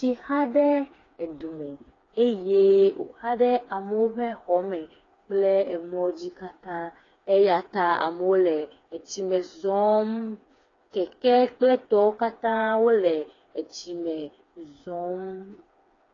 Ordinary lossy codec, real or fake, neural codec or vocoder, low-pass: AAC, 32 kbps; fake; codec, 16 kHz, 4 kbps, FreqCodec, smaller model; 7.2 kHz